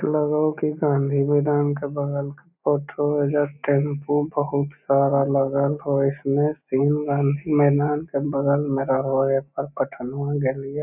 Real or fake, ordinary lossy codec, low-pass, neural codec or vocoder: real; none; 3.6 kHz; none